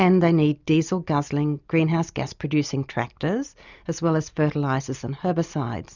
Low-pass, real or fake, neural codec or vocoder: 7.2 kHz; real; none